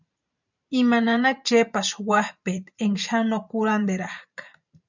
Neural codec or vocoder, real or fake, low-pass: vocoder, 44.1 kHz, 128 mel bands every 512 samples, BigVGAN v2; fake; 7.2 kHz